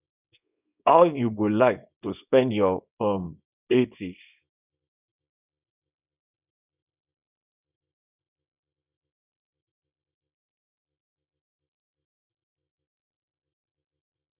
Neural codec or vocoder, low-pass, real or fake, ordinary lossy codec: codec, 24 kHz, 0.9 kbps, WavTokenizer, small release; 3.6 kHz; fake; none